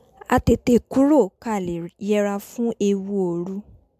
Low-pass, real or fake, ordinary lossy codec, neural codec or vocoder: 14.4 kHz; real; MP3, 96 kbps; none